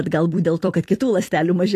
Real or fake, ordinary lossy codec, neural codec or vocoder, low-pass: fake; AAC, 48 kbps; vocoder, 44.1 kHz, 128 mel bands every 256 samples, BigVGAN v2; 14.4 kHz